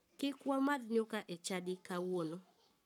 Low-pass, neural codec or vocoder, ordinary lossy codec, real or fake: 19.8 kHz; codec, 44.1 kHz, 7.8 kbps, Pupu-Codec; none; fake